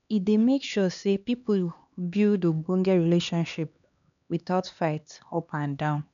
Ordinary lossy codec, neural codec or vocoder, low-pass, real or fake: none; codec, 16 kHz, 2 kbps, X-Codec, HuBERT features, trained on LibriSpeech; 7.2 kHz; fake